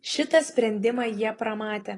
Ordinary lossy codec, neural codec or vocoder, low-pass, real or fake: AAC, 32 kbps; none; 10.8 kHz; real